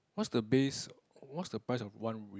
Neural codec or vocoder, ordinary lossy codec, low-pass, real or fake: none; none; none; real